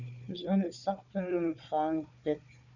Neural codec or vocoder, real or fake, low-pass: codec, 16 kHz, 4 kbps, FunCodec, trained on Chinese and English, 50 frames a second; fake; 7.2 kHz